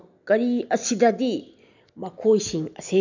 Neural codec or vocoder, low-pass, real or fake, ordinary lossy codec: none; 7.2 kHz; real; none